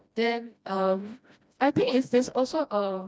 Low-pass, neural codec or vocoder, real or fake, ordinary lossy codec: none; codec, 16 kHz, 1 kbps, FreqCodec, smaller model; fake; none